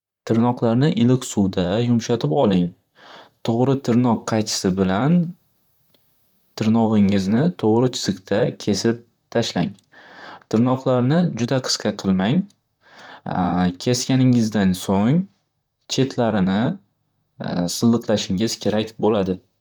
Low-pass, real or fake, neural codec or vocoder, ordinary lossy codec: 19.8 kHz; fake; vocoder, 44.1 kHz, 128 mel bands, Pupu-Vocoder; none